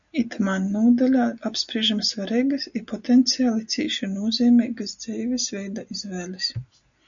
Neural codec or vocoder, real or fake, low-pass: none; real; 7.2 kHz